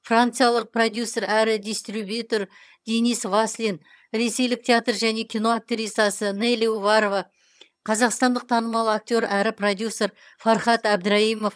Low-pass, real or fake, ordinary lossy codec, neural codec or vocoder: none; fake; none; vocoder, 22.05 kHz, 80 mel bands, HiFi-GAN